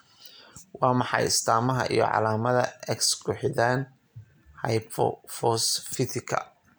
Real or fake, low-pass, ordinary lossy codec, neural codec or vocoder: real; none; none; none